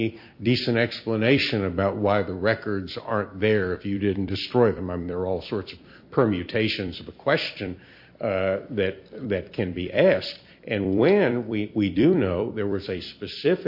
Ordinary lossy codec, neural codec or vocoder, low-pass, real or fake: MP3, 32 kbps; vocoder, 44.1 kHz, 128 mel bands every 256 samples, BigVGAN v2; 5.4 kHz; fake